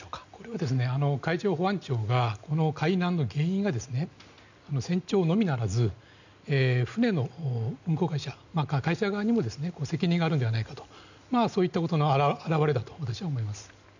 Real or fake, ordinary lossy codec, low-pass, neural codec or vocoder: real; none; 7.2 kHz; none